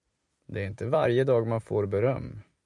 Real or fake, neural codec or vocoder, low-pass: fake; vocoder, 44.1 kHz, 128 mel bands every 256 samples, BigVGAN v2; 10.8 kHz